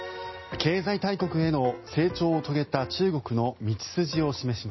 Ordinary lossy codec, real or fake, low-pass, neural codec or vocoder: MP3, 24 kbps; real; 7.2 kHz; none